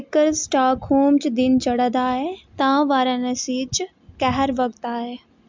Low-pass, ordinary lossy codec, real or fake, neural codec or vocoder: 7.2 kHz; MP3, 64 kbps; real; none